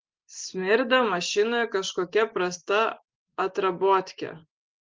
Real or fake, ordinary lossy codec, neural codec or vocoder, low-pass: real; Opus, 16 kbps; none; 7.2 kHz